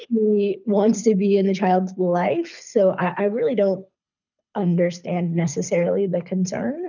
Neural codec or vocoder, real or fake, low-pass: codec, 24 kHz, 6 kbps, HILCodec; fake; 7.2 kHz